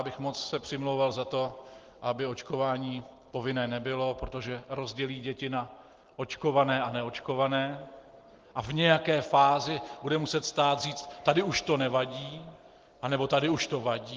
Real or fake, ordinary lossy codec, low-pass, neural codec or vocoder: real; Opus, 16 kbps; 7.2 kHz; none